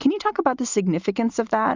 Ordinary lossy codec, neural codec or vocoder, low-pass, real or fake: Opus, 64 kbps; none; 7.2 kHz; real